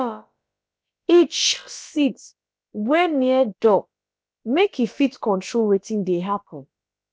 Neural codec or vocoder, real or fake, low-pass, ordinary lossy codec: codec, 16 kHz, about 1 kbps, DyCAST, with the encoder's durations; fake; none; none